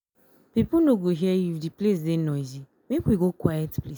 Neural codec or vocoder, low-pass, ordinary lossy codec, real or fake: none; none; none; real